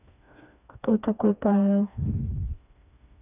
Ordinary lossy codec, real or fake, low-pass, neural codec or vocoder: none; fake; 3.6 kHz; codec, 16 kHz, 2 kbps, FreqCodec, smaller model